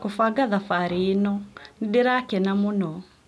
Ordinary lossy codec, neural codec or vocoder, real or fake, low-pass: none; none; real; none